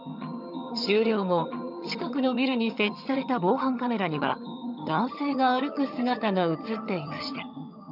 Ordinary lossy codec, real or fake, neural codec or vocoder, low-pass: none; fake; vocoder, 22.05 kHz, 80 mel bands, HiFi-GAN; 5.4 kHz